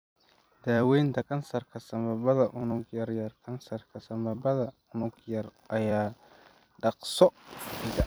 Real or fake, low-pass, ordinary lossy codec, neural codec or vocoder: fake; none; none; vocoder, 44.1 kHz, 128 mel bands every 256 samples, BigVGAN v2